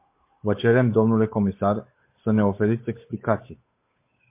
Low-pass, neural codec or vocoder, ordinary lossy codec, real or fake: 3.6 kHz; codec, 16 kHz, 8 kbps, FunCodec, trained on Chinese and English, 25 frames a second; MP3, 24 kbps; fake